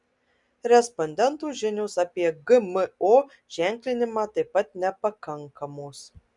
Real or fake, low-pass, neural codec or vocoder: real; 10.8 kHz; none